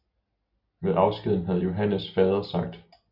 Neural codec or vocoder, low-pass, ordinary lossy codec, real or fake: none; 5.4 kHz; Opus, 64 kbps; real